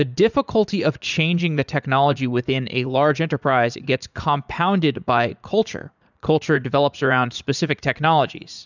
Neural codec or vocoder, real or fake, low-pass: vocoder, 44.1 kHz, 80 mel bands, Vocos; fake; 7.2 kHz